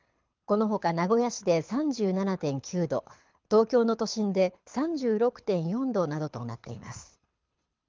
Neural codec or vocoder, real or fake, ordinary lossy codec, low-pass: codec, 24 kHz, 6 kbps, HILCodec; fake; Opus, 24 kbps; 7.2 kHz